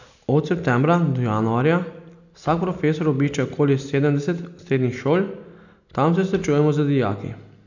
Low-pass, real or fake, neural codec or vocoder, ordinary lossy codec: 7.2 kHz; real; none; none